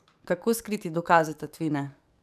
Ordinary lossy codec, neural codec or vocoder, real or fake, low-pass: none; codec, 44.1 kHz, 7.8 kbps, DAC; fake; 14.4 kHz